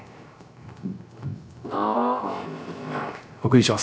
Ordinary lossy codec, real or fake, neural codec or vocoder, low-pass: none; fake; codec, 16 kHz, 0.3 kbps, FocalCodec; none